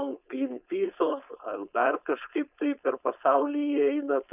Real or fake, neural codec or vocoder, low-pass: fake; codec, 16 kHz, 4.8 kbps, FACodec; 3.6 kHz